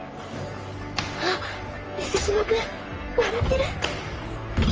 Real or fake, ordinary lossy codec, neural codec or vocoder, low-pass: fake; Opus, 24 kbps; codec, 44.1 kHz, 3.4 kbps, Pupu-Codec; 7.2 kHz